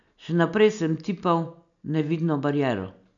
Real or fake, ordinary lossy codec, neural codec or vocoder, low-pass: real; none; none; 7.2 kHz